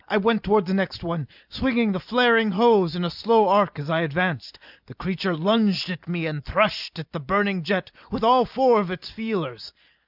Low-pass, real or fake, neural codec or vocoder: 5.4 kHz; real; none